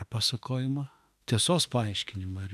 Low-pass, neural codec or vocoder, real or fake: 14.4 kHz; autoencoder, 48 kHz, 32 numbers a frame, DAC-VAE, trained on Japanese speech; fake